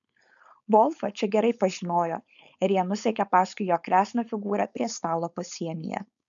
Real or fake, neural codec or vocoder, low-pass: fake; codec, 16 kHz, 4.8 kbps, FACodec; 7.2 kHz